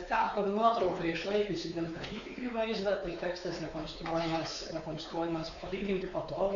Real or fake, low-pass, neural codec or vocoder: fake; 7.2 kHz; codec, 16 kHz, 4 kbps, X-Codec, WavLM features, trained on Multilingual LibriSpeech